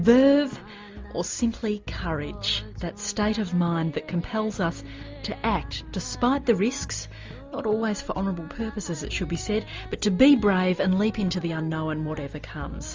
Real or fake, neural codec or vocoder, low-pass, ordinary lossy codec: real; none; 7.2 kHz; Opus, 32 kbps